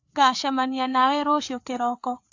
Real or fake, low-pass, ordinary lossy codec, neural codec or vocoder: fake; 7.2 kHz; AAC, 48 kbps; codec, 16 kHz, 4 kbps, FreqCodec, larger model